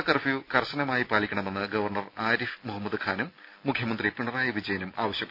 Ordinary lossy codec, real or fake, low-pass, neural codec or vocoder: none; real; 5.4 kHz; none